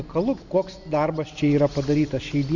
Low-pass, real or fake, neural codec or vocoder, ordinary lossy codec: 7.2 kHz; real; none; Opus, 64 kbps